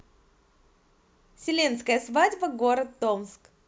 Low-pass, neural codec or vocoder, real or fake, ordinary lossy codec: none; none; real; none